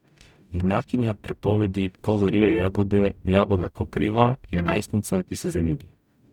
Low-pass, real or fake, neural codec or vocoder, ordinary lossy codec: 19.8 kHz; fake; codec, 44.1 kHz, 0.9 kbps, DAC; none